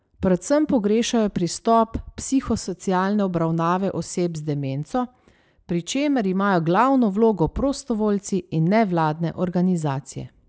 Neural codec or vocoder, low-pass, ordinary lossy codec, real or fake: none; none; none; real